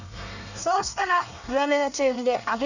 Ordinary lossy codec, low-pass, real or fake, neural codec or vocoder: none; 7.2 kHz; fake; codec, 24 kHz, 1 kbps, SNAC